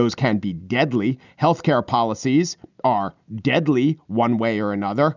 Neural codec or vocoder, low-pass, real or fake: none; 7.2 kHz; real